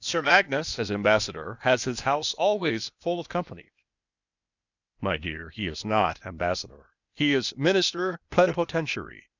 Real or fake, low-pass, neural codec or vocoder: fake; 7.2 kHz; codec, 16 kHz, 0.8 kbps, ZipCodec